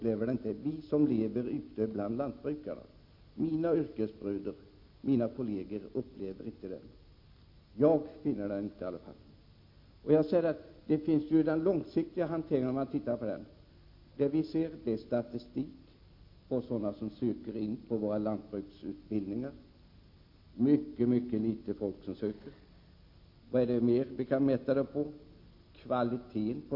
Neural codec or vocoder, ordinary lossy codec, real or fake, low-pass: none; MP3, 32 kbps; real; 5.4 kHz